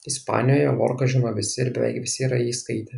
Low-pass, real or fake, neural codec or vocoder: 10.8 kHz; real; none